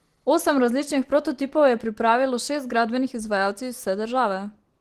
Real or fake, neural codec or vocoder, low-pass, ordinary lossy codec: real; none; 14.4 kHz; Opus, 16 kbps